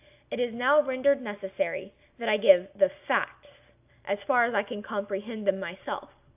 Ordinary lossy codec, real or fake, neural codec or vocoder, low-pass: AAC, 32 kbps; real; none; 3.6 kHz